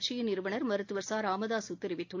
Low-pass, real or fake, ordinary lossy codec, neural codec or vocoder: 7.2 kHz; real; AAC, 48 kbps; none